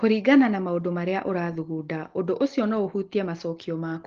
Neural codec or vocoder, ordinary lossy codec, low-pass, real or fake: none; Opus, 16 kbps; 7.2 kHz; real